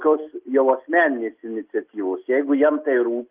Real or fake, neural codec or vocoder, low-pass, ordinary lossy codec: real; none; 3.6 kHz; Opus, 32 kbps